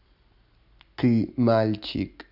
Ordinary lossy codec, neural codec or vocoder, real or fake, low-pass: none; none; real; 5.4 kHz